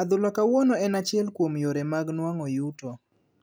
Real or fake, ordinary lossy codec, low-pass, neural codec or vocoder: real; none; none; none